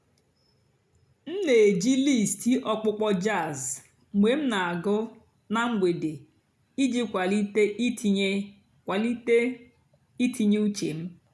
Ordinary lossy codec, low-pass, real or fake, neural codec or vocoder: none; none; real; none